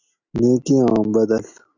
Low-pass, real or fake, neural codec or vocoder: 7.2 kHz; real; none